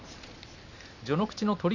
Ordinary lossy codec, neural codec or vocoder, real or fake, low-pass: none; none; real; 7.2 kHz